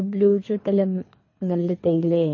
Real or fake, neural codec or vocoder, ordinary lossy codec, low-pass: fake; codec, 24 kHz, 3 kbps, HILCodec; MP3, 32 kbps; 7.2 kHz